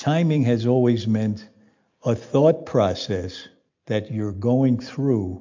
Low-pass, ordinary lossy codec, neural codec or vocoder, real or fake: 7.2 kHz; MP3, 48 kbps; none; real